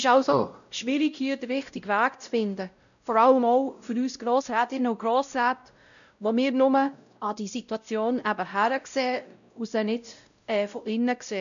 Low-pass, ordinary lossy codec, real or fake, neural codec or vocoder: 7.2 kHz; none; fake; codec, 16 kHz, 0.5 kbps, X-Codec, WavLM features, trained on Multilingual LibriSpeech